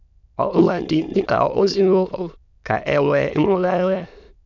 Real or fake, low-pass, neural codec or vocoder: fake; 7.2 kHz; autoencoder, 22.05 kHz, a latent of 192 numbers a frame, VITS, trained on many speakers